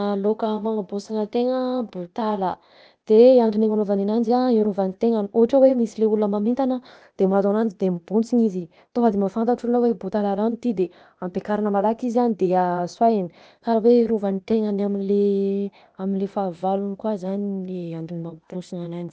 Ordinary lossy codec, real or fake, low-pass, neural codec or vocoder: none; fake; none; codec, 16 kHz, 0.8 kbps, ZipCodec